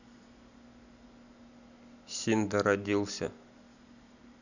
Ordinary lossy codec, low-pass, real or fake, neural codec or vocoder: none; 7.2 kHz; real; none